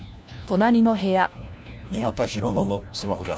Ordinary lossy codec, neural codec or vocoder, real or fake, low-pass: none; codec, 16 kHz, 1 kbps, FunCodec, trained on LibriTTS, 50 frames a second; fake; none